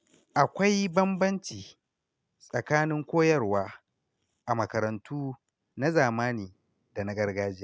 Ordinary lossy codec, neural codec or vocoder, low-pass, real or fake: none; none; none; real